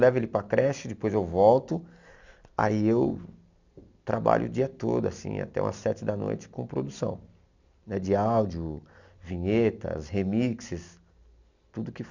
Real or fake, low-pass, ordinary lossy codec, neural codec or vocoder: real; 7.2 kHz; none; none